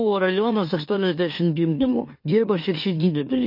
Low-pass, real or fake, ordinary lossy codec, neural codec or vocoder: 5.4 kHz; fake; MP3, 32 kbps; autoencoder, 44.1 kHz, a latent of 192 numbers a frame, MeloTTS